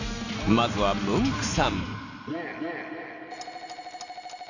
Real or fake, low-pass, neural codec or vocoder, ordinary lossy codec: real; 7.2 kHz; none; none